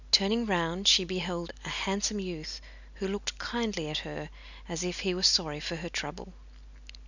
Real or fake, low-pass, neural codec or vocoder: real; 7.2 kHz; none